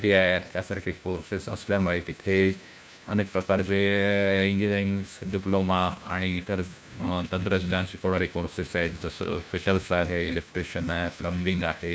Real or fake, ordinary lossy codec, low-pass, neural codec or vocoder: fake; none; none; codec, 16 kHz, 1 kbps, FunCodec, trained on LibriTTS, 50 frames a second